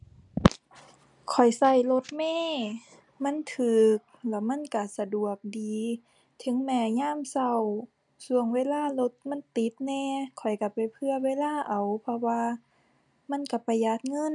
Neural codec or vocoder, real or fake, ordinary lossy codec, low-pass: none; real; none; 10.8 kHz